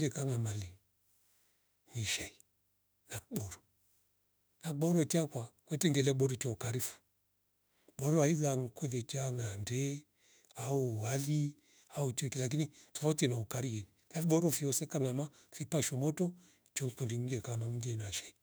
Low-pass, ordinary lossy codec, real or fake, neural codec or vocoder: none; none; fake; autoencoder, 48 kHz, 32 numbers a frame, DAC-VAE, trained on Japanese speech